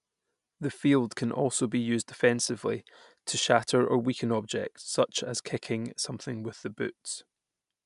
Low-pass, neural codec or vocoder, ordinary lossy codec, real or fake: 10.8 kHz; none; MP3, 96 kbps; real